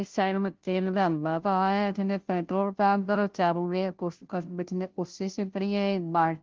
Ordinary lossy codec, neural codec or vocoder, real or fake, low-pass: Opus, 16 kbps; codec, 16 kHz, 0.5 kbps, FunCodec, trained on Chinese and English, 25 frames a second; fake; 7.2 kHz